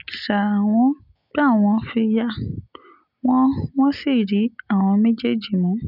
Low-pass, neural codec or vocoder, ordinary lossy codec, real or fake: 5.4 kHz; none; none; real